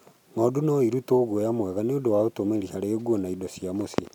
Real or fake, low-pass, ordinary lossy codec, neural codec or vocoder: real; 19.8 kHz; none; none